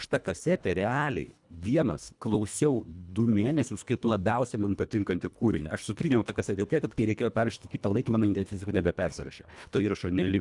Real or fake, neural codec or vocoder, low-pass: fake; codec, 24 kHz, 1.5 kbps, HILCodec; 10.8 kHz